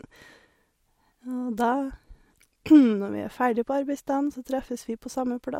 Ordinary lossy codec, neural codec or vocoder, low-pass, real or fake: MP3, 64 kbps; none; 19.8 kHz; real